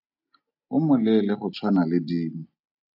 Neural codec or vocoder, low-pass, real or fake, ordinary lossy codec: none; 5.4 kHz; real; AAC, 48 kbps